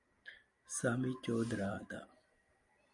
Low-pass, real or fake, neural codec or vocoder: 10.8 kHz; real; none